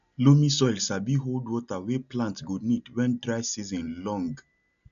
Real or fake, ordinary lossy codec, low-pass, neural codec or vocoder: real; none; 7.2 kHz; none